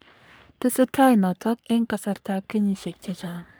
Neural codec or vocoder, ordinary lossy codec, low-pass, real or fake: codec, 44.1 kHz, 3.4 kbps, Pupu-Codec; none; none; fake